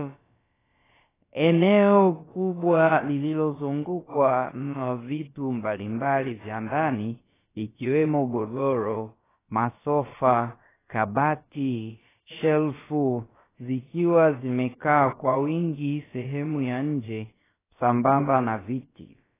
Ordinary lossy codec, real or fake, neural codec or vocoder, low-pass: AAC, 16 kbps; fake; codec, 16 kHz, about 1 kbps, DyCAST, with the encoder's durations; 3.6 kHz